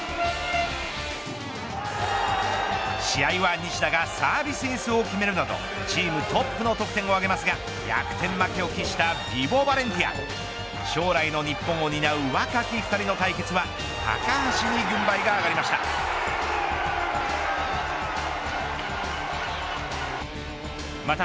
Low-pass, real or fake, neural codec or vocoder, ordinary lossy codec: none; real; none; none